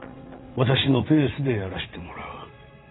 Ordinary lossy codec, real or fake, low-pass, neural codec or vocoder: AAC, 16 kbps; fake; 7.2 kHz; vocoder, 22.05 kHz, 80 mel bands, WaveNeXt